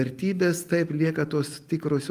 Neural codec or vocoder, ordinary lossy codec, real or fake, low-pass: none; Opus, 32 kbps; real; 14.4 kHz